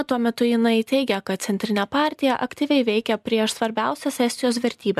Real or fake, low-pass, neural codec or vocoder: real; 14.4 kHz; none